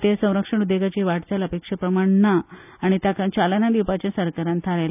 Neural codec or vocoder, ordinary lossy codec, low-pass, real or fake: none; none; 3.6 kHz; real